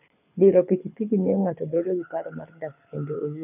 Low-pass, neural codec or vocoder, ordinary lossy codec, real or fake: 3.6 kHz; codec, 24 kHz, 6 kbps, HILCodec; none; fake